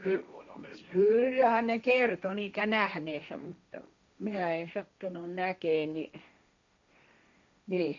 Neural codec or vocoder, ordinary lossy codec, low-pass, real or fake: codec, 16 kHz, 1.1 kbps, Voila-Tokenizer; none; 7.2 kHz; fake